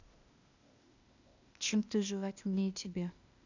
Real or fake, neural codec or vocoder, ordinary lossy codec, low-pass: fake; codec, 16 kHz, 0.8 kbps, ZipCodec; none; 7.2 kHz